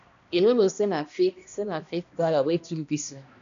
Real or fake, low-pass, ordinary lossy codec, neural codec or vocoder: fake; 7.2 kHz; AAC, 96 kbps; codec, 16 kHz, 1 kbps, X-Codec, HuBERT features, trained on balanced general audio